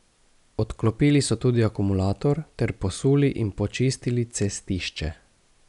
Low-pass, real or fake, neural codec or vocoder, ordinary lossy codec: 10.8 kHz; real; none; none